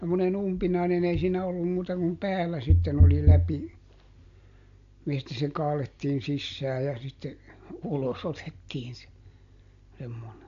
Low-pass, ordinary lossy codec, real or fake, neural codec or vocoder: 7.2 kHz; AAC, 64 kbps; real; none